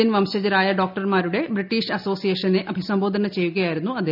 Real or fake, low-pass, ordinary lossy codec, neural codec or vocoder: real; 5.4 kHz; none; none